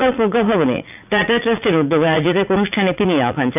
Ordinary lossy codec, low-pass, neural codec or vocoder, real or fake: none; 3.6 kHz; vocoder, 44.1 kHz, 80 mel bands, Vocos; fake